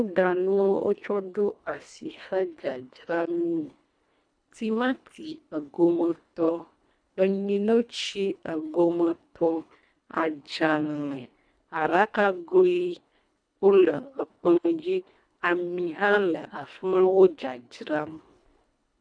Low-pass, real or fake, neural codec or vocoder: 9.9 kHz; fake; codec, 24 kHz, 1.5 kbps, HILCodec